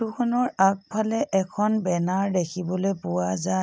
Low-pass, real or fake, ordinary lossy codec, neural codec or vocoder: none; real; none; none